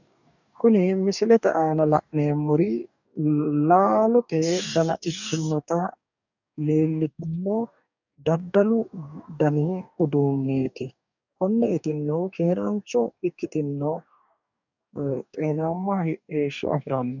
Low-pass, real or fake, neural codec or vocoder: 7.2 kHz; fake; codec, 44.1 kHz, 2.6 kbps, DAC